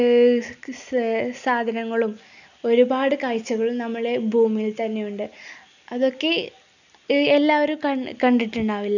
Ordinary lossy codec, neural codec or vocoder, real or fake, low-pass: none; none; real; 7.2 kHz